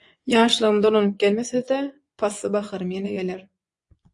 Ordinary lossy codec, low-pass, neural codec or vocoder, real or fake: AAC, 64 kbps; 10.8 kHz; vocoder, 24 kHz, 100 mel bands, Vocos; fake